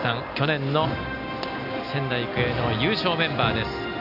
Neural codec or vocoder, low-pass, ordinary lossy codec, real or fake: none; 5.4 kHz; none; real